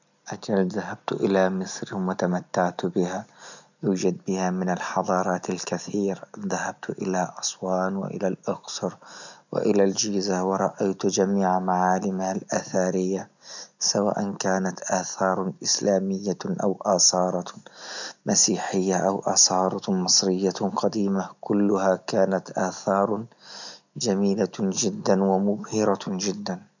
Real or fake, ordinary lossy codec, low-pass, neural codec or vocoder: real; none; 7.2 kHz; none